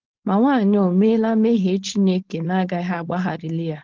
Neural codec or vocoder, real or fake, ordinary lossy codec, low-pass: codec, 16 kHz, 4.8 kbps, FACodec; fake; Opus, 16 kbps; 7.2 kHz